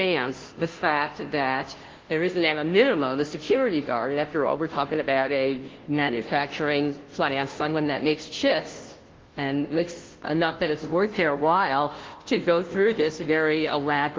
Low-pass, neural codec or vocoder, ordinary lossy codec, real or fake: 7.2 kHz; codec, 16 kHz, 0.5 kbps, FunCodec, trained on Chinese and English, 25 frames a second; Opus, 16 kbps; fake